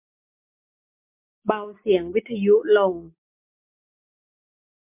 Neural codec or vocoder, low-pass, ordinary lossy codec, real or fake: none; 3.6 kHz; MP3, 32 kbps; real